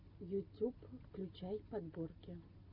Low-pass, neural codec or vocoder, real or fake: 5.4 kHz; none; real